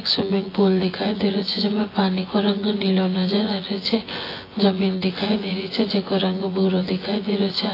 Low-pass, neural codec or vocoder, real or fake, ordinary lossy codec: 5.4 kHz; vocoder, 24 kHz, 100 mel bands, Vocos; fake; AAC, 24 kbps